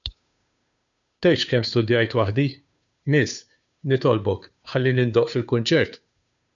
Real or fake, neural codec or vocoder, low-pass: fake; codec, 16 kHz, 2 kbps, FunCodec, trained on Chinese and English, 25 frames a second; 7.2 kHz